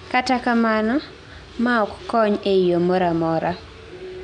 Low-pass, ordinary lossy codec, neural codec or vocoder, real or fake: 9.9 kHz; none; none; real